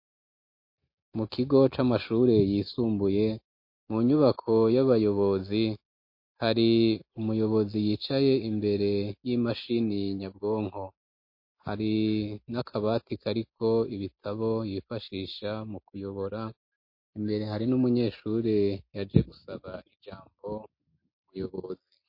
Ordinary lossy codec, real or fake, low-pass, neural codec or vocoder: MP3, 32 kbps; real; 5.4 kHz; none